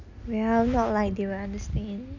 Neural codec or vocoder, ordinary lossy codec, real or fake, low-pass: none; none; real; 7.2 kHz